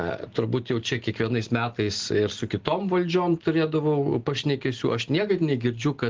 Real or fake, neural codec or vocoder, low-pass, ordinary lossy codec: real; none; 7.2 kHz; Opus, 16 kbps